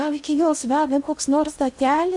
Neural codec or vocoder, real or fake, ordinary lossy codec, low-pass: codec, 16 kHz in and 24 kHz out, 0.6 kbps, FocalCodec, streaming, 2048 codes; fake; MP3, 64 kbps; 10.8 kHz